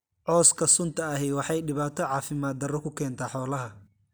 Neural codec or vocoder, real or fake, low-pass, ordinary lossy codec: none; real; none; none